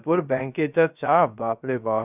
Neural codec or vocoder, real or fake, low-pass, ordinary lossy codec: codec, 16 kHz, 0.3 kbps, FocalCodec; fake; 3.6 kHz; none